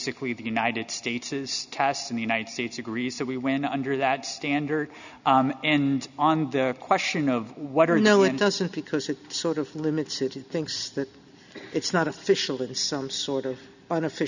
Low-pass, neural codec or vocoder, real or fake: 7.2 kHz; none; real